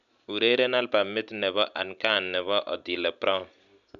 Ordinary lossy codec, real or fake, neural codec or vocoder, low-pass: none; real; none; 7.2 kHz